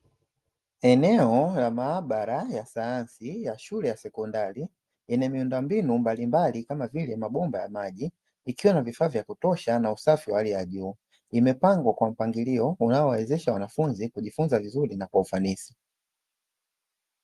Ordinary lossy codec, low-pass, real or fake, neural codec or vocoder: Opus, 32 kbps; 14.4 kHz; real; none